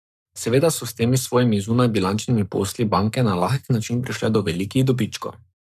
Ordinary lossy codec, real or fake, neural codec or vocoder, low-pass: none; fake; codec, 44.1 kHz, 7.8 kbps, Pupu-Codec; 14.4 kHz